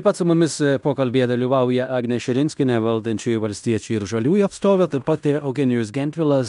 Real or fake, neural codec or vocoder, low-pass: fake; codec, 16 kHz in and 24 kHz out, 0.9 kbps, LongCat-Audio-Codec, fine tuned four codebook decoder; 10.8 kHz